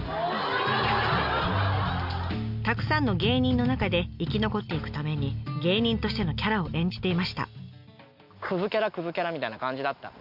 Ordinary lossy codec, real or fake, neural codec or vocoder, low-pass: none; real; none; 5.4 kHz